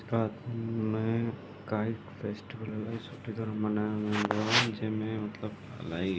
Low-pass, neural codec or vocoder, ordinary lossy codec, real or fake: none; none; none; real